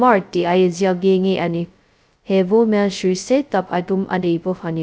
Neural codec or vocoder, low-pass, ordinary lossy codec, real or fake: codec, 16 kHz, 0.2 kbps, FocalCodec; none; none; fake